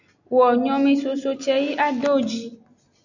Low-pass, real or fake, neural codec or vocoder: 7.2 kHz; real; none